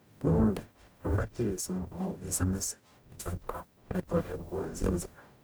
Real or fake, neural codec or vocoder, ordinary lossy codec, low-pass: fake; codec, 44.1 kHz, 0.9 kbps, DAC; none; none